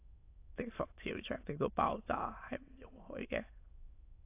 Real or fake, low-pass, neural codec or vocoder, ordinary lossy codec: fake; 3.6 kHz; autoencoder, 22.05 kHz, a latent of 192 numbers a frame, VITS, trained on many speakers; AAC, 24 kbps